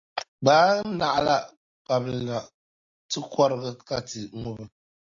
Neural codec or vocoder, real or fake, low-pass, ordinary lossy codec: none; real; 7.2 kHz; AAC, 48 kbps